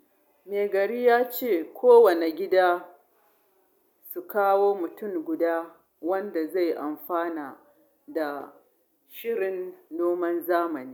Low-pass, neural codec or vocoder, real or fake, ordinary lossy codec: 19.8 kHz; none; real; none